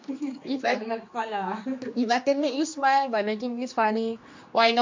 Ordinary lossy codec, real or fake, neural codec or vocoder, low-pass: MP3, 48 kbps; fake; codec, 16 kHz, 2 kbps, X-Codec, HuBERT features, trained on general audio; 7.2 kHz